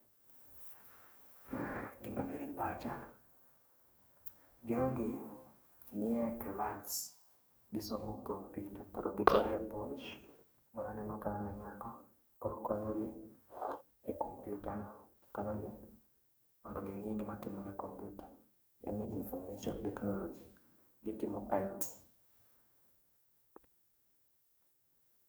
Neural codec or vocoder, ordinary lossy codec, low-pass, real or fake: codec, 44.1 kHz, 2.6 kbps, DAC; none; none; fake